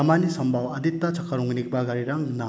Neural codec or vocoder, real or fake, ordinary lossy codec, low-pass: none; real; none; none